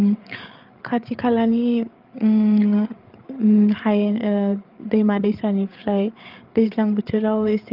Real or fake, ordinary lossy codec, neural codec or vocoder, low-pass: fake; Opus, 32 kbps; codec, 16 kHz, 8 kbps, FreqCodec, larger model; 5.4 kHz